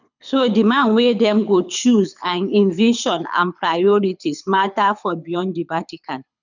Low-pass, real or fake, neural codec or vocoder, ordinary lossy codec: 7.2 kHz; fake; codec, 24 kHz, 6 kbps, HILCodec; none